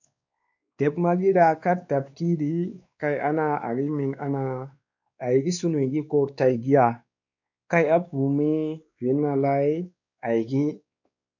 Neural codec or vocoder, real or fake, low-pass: codec, 16 kHz, 2 kbps, X-Codec, WavLM features, trained on Multilingual LibriSpeech; fake; 7.2 kHz